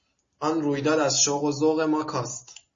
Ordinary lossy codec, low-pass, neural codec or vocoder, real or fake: MP3, 32 kbps; 7.2 kHz; none; real